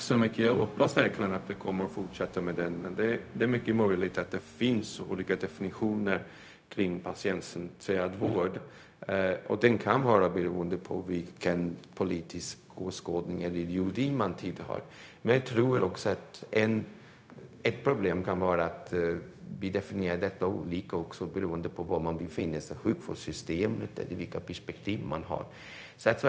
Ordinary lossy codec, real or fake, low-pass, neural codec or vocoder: none; fake; none; codec, 16 kHz, 0.4 kbps, LongCat-Audio-Codec